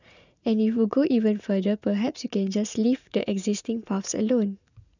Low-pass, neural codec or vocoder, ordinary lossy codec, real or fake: 7.2 kHz; vocoder, 44.1 kHz, 80 mel bands, Vocos; none; fake